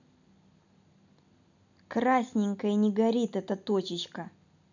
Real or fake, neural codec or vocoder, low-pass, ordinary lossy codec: real; none; 7.2 kHz; none